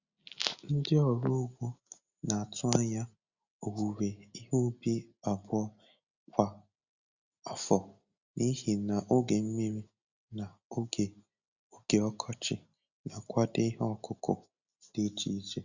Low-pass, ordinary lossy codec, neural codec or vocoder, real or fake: 7.2 kHz; Opus, 64 kbps; none; real